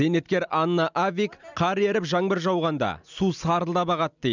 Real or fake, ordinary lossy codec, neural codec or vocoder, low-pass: real; none; none; 7.2 kHz